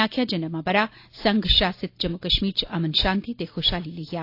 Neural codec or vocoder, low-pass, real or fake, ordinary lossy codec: none; 5.4 kHz; real; AAC, 32 kbps